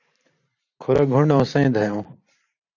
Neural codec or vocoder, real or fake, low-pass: vocoder, 44.1 kHz, 128 mel bands every 512 samples, BigVGAN v2; fake; 7.2 kHz